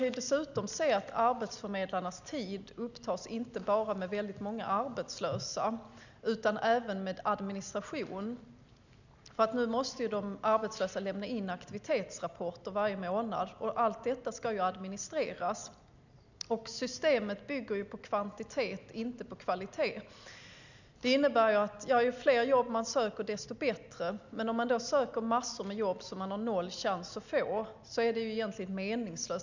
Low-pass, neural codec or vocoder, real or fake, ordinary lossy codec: 7.2 kHz; none; real; none